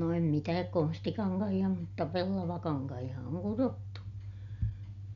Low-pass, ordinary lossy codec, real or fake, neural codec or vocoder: 7.2 kHz; none; real; none